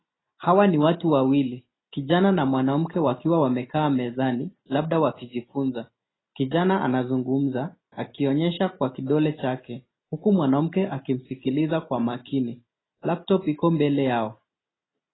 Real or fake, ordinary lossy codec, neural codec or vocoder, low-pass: real; AAC, 16 kbps; none; 7.2 kHz